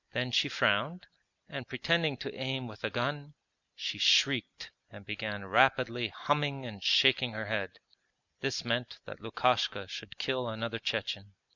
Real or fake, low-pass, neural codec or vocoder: real; 7.2 kHz; none